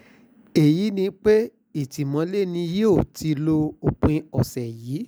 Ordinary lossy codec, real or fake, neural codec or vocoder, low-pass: none; real; none; none